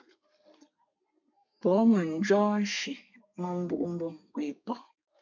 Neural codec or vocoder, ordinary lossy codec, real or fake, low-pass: codec, 32 kHz, 1.9 kbps, SNAC; MP3, 64 kbps; fake; 7.2 kHz